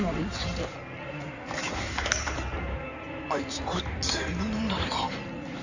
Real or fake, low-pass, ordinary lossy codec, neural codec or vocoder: fake; 7.2 kHz; none; codec, 16 kHz in and 24 kHz out, 2.2 kbps, FireRedTTS-2 codec